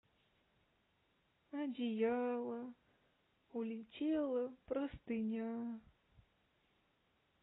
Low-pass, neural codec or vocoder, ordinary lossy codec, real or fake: 7.2 kHz; none; AAC, 16 kbps; real